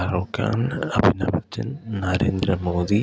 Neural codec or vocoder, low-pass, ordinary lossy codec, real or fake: none; none; none; real